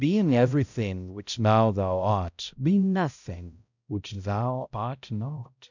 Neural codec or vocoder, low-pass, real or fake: codec, 16 kHz, 0.5 kbps, X-Codec, HuBERT features, trained on balanced general audio; 7.2 kHz; fake